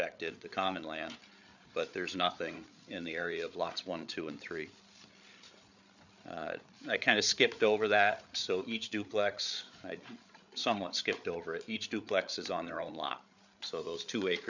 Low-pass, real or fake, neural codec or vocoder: 7.2 kHz; fake; codec, 16 kHz, 8 kbps, FreqCodec, larger model